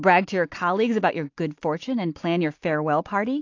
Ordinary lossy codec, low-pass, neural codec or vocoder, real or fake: AAC, 48 kbps; 7.2 kHz; none; real